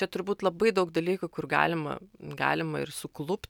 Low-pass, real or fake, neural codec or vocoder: 19.8 kHz; real; none